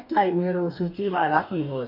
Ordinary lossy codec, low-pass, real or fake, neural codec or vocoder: none; 5.4 kHz; fake; codec, 44.1 kHz, 2.6 kbps, DAC